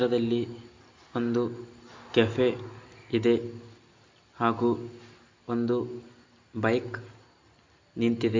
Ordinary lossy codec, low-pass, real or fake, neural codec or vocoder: MP3, 48 kbps; 7.2 kHz; real; none